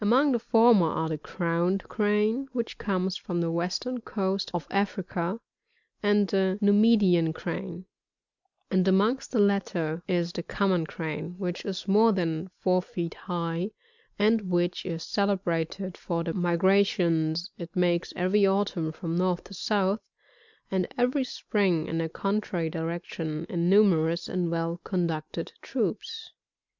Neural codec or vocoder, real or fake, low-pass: none; real; 7.2 kHz